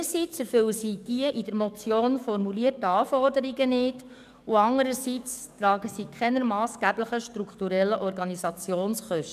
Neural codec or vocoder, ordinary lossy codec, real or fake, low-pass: codec, 44.1 kHz, 7.8 kbps, DAC; none; fake; 14.4 kHz